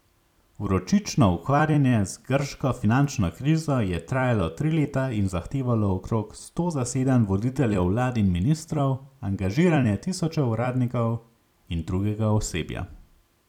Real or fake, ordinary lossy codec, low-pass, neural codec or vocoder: fake; none; 19.8 kHz; vocoder, 44.1 kHz, 128 mel bands every 256 samples, BigVGAN v2